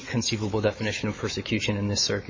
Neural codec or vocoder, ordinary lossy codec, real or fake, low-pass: autoencoder, 48 kHz, 128 numbers a frame, DAC-VAE, trained on Japanese speech; MP3, 32 kbps; fake; 7.2 kHz